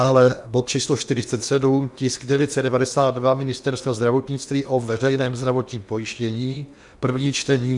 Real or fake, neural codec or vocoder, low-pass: fake; codec, 16 kHz in and 24 kHz out, 0.8 kbps, FocalCodec, streaming, 65536 codes; 10.8 kHz